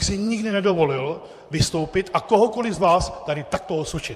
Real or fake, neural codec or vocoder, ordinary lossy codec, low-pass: fake; vocoder, 44.1 kHz, 128 mel bands, Pupu-Vocoder; MP3, 64 kbps; 14.4 kHz